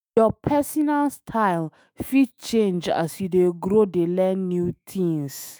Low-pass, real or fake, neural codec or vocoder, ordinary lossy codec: none; fake; autoencoder, 48 kHz, 128 numbers a frame, DAC-VAE, trained on Japanese speech; none